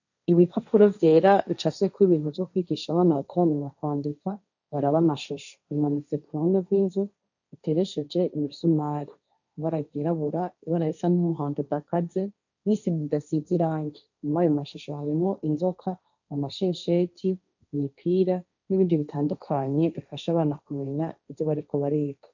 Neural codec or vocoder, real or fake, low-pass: codec, 16 kHz, 1.1 kbps, Voila-Tokenizer; fake; 7.2 kHz